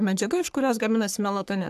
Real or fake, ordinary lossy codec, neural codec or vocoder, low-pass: fake; AAC, 96 kbps; codec, 44.1 kHz, 3.4 kbps, Pupu-Codec; 14.4 kHz